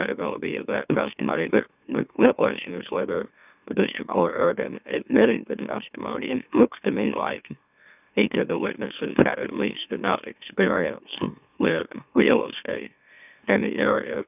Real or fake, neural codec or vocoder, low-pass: fake; autoencoder, 44.1 kHz, a latent of 192 numbers a frame, MeloTTS; 3.6 kHz